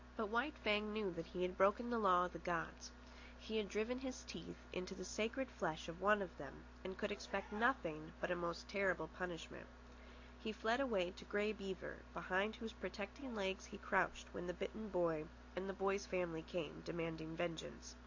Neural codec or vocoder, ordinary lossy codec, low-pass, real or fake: none; AAC, 48 kbps; 7.2 kHz; real